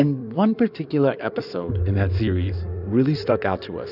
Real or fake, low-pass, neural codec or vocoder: fake; 5.4 kHz; codec, 16 kHz in and 24 kHz out, 2.2 kbps, FireRedTTS-2 codec